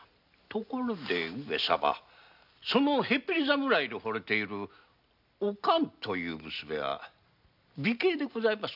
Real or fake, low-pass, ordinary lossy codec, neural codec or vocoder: real; 5.4 kHz; none; none